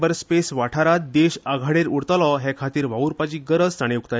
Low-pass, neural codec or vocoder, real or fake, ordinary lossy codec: none; none; real; none